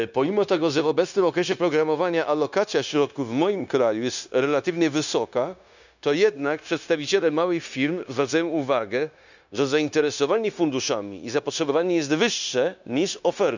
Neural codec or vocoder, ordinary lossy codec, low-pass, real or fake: codec, 16 kHz, 0.9 kbps, LongCat-Audio-Codec; none; 7.2 kHz; fake